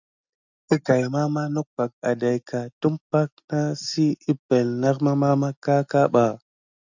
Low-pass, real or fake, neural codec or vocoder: 7.2 kHz; real; none